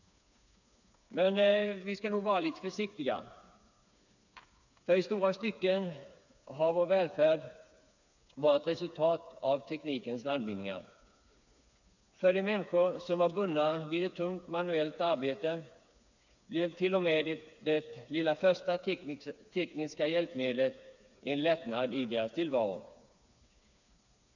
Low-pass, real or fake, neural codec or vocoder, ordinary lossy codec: 7.2 kHz; fake; codec, 16 kHz, 4 kbps, FreqCodec, smaller model; none